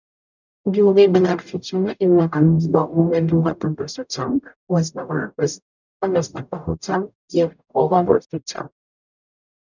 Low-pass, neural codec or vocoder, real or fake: 7.2 kHz; codec, 44.1 kHz, 0.9 kbps, DAC; fake